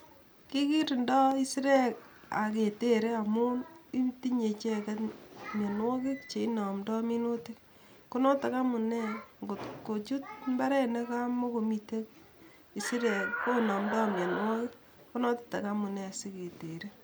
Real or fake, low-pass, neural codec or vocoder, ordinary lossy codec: real; none; none; none